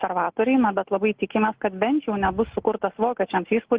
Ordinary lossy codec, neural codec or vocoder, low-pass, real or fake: Opus, 16 kbps; none; 3.6 kHz; real